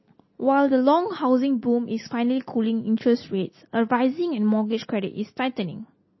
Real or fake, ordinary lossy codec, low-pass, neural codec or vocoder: real; MP3, 24 kbps; 7.2 kHz; none